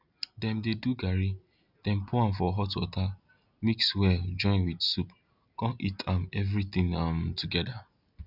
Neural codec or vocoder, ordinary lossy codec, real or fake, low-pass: none; none; real; 5.4 kHz